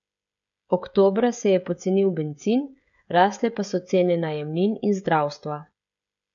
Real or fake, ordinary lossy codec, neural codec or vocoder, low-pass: fake; none; codec, 16 kHz, 16 kbps, FreqCodec, smaller model; 7.2 kHz